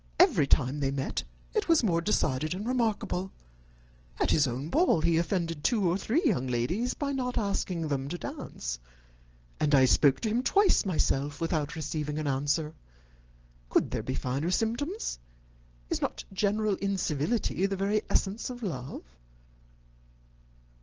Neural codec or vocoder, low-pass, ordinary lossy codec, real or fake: none; 7.2 kHz; Opus, 16 kbps; real